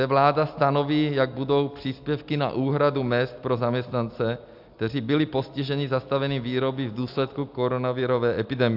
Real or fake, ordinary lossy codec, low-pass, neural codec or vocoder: real; AAC, 48 kbps; 5.4 kHz; none